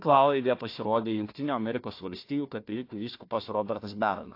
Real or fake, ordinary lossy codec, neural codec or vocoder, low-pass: fake; AAC, 32 kbps; codec, 16 kHz, 1 kbps, FunCodec, trained on Chinese and English, 50 frames a second; 5.4 kHz